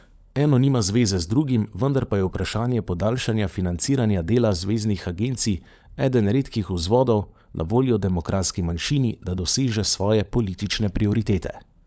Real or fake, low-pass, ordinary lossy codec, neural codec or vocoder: fake; none; none; codec, 16 kHz, 16 kbps, FunCodec, trained on LibriTTS, 50 frames a second